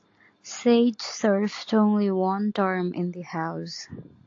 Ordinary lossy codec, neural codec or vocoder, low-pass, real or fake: AAC, 48 kbps; none; 7.2 kHz; real